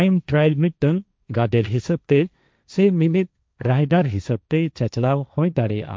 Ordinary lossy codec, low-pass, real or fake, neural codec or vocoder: none; none; fake; codec, 16 kHz, 1.1 kbps, Voila-Tokenizer